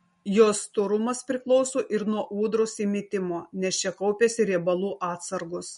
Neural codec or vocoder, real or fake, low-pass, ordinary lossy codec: none; real; 10.8 kHz; MP3, 48 kbps